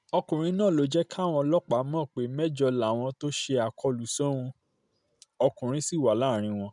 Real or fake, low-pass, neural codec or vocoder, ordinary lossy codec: real; 10.8 kHz; none; none